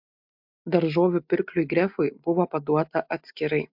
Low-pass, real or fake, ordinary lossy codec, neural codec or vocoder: 5.4 kHz; real; MP3, 48 kbps; none